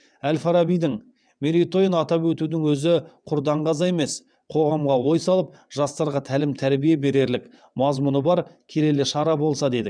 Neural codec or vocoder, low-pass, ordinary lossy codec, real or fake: vocoder, 22.05 kHz, 80 mel bands, WaveNeXt; 9.9 kHz; none; fake